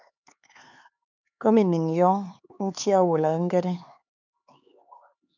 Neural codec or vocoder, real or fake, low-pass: codec, 16 kHz, 4 kbps, X-Codec, HuBERT features, trained on LibriSpeech; fake; 7.2 kHz